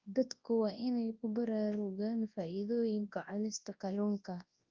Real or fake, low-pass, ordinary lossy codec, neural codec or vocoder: fake; 7.2 kHz; Opus, 32 kbps; codec, 24 kHz, 0.9 kbps, WavTokenizer, large speech release